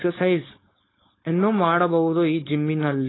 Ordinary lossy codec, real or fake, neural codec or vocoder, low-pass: AAC, 16 kbps; fake; codec, 16 kHz, 4.8 kbps, FACodec; 7.2 kHz